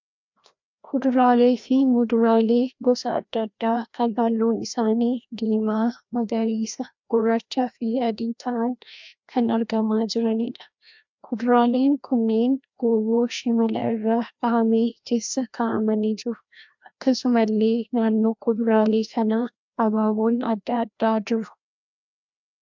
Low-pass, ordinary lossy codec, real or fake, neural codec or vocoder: 7.2 kHz; MP3, 64 kbps; fake; codec, 16 kHz, 1 kbps, FreqCodec, larger model